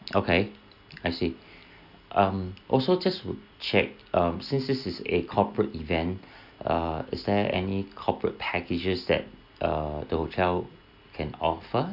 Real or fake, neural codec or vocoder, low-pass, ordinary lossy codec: real; none; 5.4 kHz; none